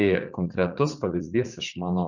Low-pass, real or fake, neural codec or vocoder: 7.2 kHz; fake; vocoder, 44.1 kHz, 80 mel bands, Vocos